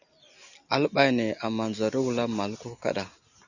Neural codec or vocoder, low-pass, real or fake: none; 7.2 kHz; real